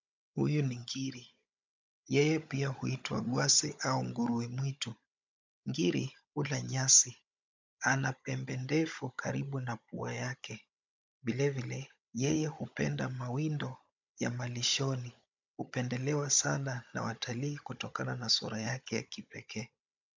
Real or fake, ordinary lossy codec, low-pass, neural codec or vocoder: fake; MP3, 64 kbps; 7.2 kHz; codec, 16 kHz, 16 kbps, FunCodec, trained on Chinese and English, 50 frames a second